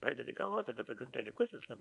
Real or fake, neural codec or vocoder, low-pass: fake; codec, 24 kHz, 0.9 kbps, WavTokenizer, small release; 10.8 kHz